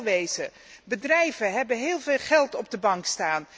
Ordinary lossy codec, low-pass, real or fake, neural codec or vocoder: none; none; real; none